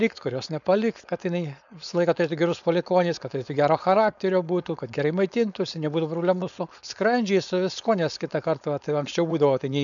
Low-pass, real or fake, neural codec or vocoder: 7.2 kHz; fake; codec, 16 kHz, 4.8 kbps, FACodec